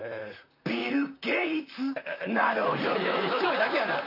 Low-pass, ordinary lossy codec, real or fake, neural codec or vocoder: 5.4 kHz; none; fake; vocoder, 44.1 kHz, 128 mel bands, Pupu-Vocoder